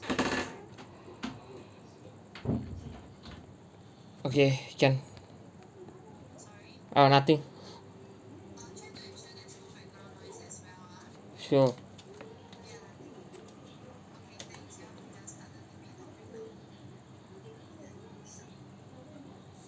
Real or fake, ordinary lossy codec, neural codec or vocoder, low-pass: real; none; none; none